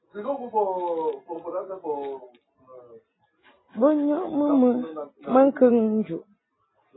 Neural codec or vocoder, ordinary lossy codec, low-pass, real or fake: none; AAC, 16 kbps; 7.2 kHz; real